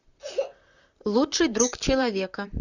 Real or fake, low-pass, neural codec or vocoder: fake; 7.2 kHz; vocoder, 44.1 kHz, 128 mel bands, Pupu-Vocoder